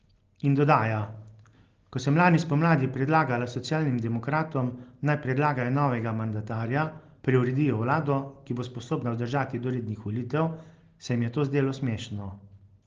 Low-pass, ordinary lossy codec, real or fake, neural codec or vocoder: 7.2 kHz; Opus, 16 kbps; real; none